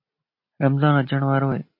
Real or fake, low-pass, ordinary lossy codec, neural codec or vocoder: real; 5.4 kHz; MP3, 32 kbps; none